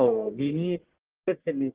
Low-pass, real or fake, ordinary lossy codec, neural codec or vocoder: 3.6 kHz; fake; Opus, 16 kbps; codec, 44.1 kHz, 1.7 kbps, Pupu-Codec